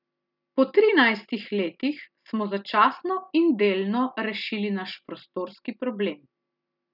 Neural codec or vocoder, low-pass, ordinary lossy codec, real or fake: none; 5.4 kHz; none; real